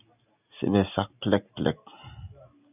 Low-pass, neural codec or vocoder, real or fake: 3.6 kHz; none; real